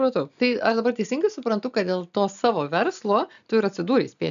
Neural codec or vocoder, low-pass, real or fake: none; 7.2 kHz; real